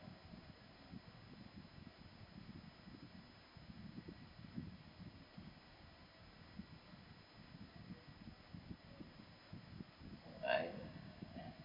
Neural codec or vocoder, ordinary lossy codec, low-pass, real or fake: none; none; 5.4 kHz; real